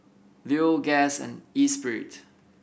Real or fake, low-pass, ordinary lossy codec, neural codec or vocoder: real; none; none; none